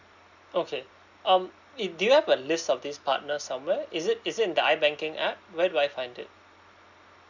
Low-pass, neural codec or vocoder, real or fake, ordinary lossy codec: 7.2 kHz; none; real; none